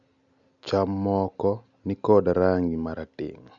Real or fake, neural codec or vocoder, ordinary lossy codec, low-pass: real; none; none; 7.2 kHz